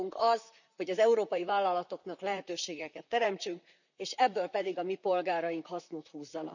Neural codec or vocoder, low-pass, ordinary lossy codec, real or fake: vocoder, 44.1 kHz, 128 mel bands, Pupu-Vocoder; 7.2 kHz; none; fake